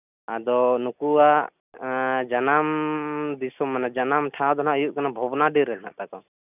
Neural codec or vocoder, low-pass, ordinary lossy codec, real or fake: none; 3.6 kHz; none; real